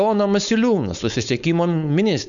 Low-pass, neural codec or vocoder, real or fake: 7.2 kHz; codec, 16 kHz, 4.8 kbps, FACodec; fake